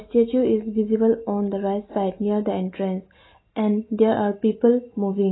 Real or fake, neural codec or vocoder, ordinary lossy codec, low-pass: real; none; AAC, 16 kbps; 7.2 kHz